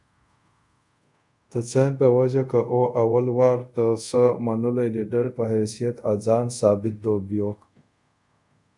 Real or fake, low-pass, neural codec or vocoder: fake; 10.8 kHz; codec, 24 kHz, 0.5 kbps, DualCodec